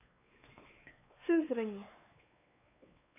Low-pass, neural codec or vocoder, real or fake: 3.6 kHz; codec, 16 kHz, 4 kbps, X-Codec, WavLM features, trained on Multilingual LibriSpeech; fake